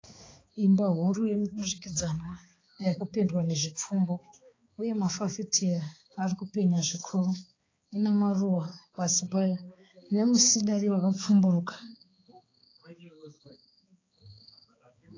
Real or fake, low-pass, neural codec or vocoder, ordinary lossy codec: fake; 7.2 kHz; codec, 16 kHz, 4 kbps, X-Codec, HuBERT features, trained on balanced general audio; AAC, 32 kbps